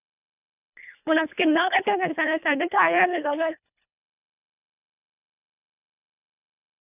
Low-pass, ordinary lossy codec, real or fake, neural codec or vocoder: 3.6 kHz; none; fake; codec, 24 kHz, 1.5 kbps, HILCodec